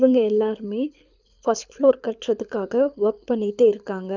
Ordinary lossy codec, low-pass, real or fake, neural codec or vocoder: none; 7.2 kHz; fake; codec, 24 kHz, 6 kbps, HILCodec